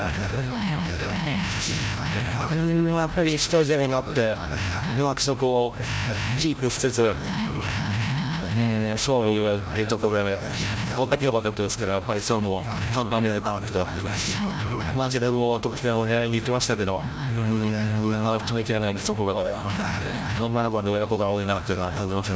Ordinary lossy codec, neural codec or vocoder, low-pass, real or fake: none; codec, 16 kHz, 0.5 kbps, FreqCodec, larger model; none; fake